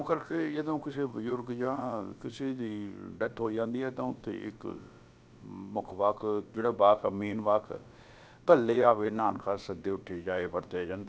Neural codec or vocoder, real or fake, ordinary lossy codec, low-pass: codec, 16 kHz, about 1 kbps, DyCAST, with the encoder's durations; fake; none; none